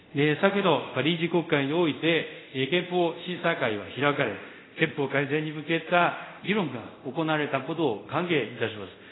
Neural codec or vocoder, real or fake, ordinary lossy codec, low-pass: codec, 24 kHz, 0.5 kbps, DualCodec; fake; AAC, 16 kbps; 7.2 kHz